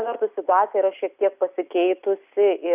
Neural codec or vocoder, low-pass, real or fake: none; 3.6 kHz; real